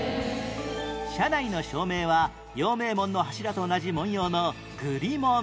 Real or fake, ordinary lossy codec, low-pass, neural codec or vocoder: real; none; none; none